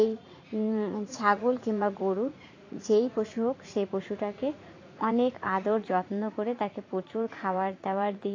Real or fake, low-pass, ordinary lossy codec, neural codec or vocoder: fake; 7.2 kHz; AAC, 32 kbps; vocoder, 44.1 kHz, 80 mel bands, Vocos